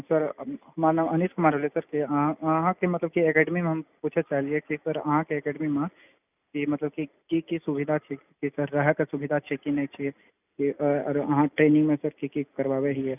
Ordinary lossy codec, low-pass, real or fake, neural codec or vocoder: none; 3.6 kHz; real; none